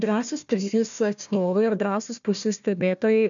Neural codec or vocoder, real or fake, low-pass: codec, 16 kHz, 1 kbps, FunCodec, trained on Chinese and English, 50 frames a second; fake; 7.2 kHz